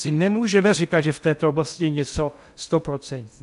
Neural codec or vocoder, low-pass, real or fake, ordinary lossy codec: codec, 16 kHz in and 24 kHz out, 0.6 kbps, FocalCodec, streaming, 2048 codes; 10.8 kHz; fake; AAC, 96 kbps